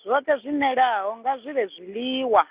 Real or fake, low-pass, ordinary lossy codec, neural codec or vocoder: real; 3.6 kHz; Opus, 64 kbps; none